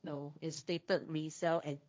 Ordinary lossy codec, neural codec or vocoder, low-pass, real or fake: none; codec, 16 kHz, 1.1 kbps, Voila-Tokenizer; none; fake